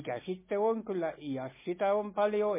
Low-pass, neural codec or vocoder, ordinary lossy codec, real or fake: 3.6 kHz; none; MP3, 16 kbps; real